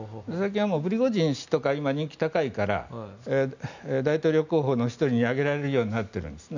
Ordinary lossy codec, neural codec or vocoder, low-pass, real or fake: none; none; 7.2 kHz; real